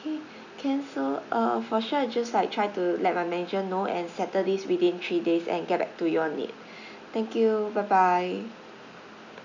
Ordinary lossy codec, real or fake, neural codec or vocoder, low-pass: none; real; none; 7.2 kHz